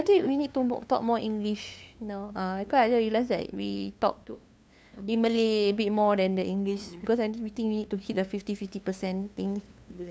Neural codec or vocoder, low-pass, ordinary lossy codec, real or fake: codec, 16 kHz, 2 kbps, FunCodec, trained on LibriTTS, 25 frames a second; none; none; fake